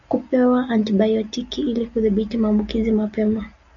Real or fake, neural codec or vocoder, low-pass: real; none; 7.2 kHz